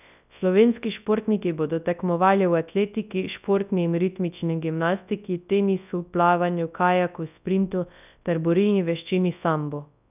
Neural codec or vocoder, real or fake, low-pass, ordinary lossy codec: codec, 24 kHz, 0.9 kbps, WavTokenizer, large speech release; fake; 3.6 kHz; none